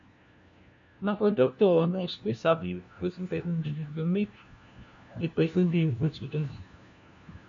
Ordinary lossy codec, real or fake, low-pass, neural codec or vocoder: MP3, 64 kbps; fake; 7.2 kHz; codec, 16 kHz, 1 kbps, FunCodec, trained on LibriTTS, 50 frames a second